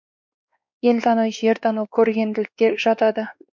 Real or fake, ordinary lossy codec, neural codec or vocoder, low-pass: fake; MP3, 64 kbps; codec, 16 kHz, 2 kbps, X-Codec, WavLM features, trained on Multilingual LibriSpeech; 7.2 kHz